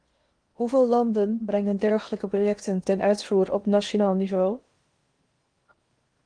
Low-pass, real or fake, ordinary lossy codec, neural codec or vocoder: 9.9 kHz; fake; Opus, 24 kbps; codec, 16 kHz in and 24 kHz out, 0.8 kbps, FocalCodec, streaming, 65536 codes